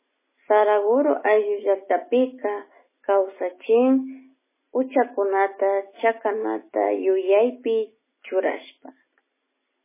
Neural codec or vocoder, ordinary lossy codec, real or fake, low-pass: none; MP3, 16 kbps; real; 3.6 kHz